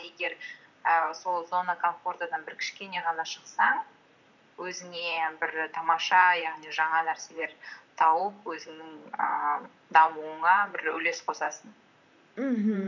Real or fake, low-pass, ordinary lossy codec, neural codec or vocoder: fake; 7.2 kHz; none; vocoder, 44.1 kHz, 128 mel bands, Pupu-Vocoder